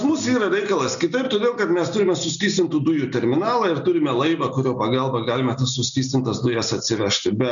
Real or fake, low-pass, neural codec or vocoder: real; 7.2 kHz; none